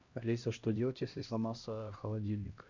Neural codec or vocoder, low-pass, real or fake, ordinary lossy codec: codec, 16 kHz, 1 kbps, X-Codec, HuBERT features, trained on LibriSpeech; 7.2 kHz; fake; Opus, 64 kbps